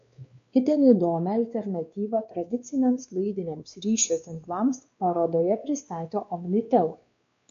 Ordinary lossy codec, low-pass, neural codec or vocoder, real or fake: MP3, 48 kbps; 7.2 kHz; codec, 16 kHz, 2 kbps, X-Codec, WavLM features, trained on Multilingual LibriSpeech; fake